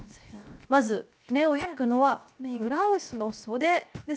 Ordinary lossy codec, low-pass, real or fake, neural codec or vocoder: none; none; fake; codec, 16 kHz, 0.7 kbps, FocalCodec